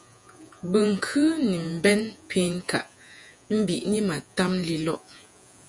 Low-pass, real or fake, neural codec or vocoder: 10.8 kHz; fake; vocoder, 48 kHz, 128 mel bands, Vocos